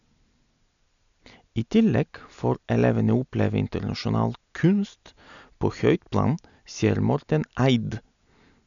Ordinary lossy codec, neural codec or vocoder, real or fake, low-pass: none; none; real; 7.2 kHz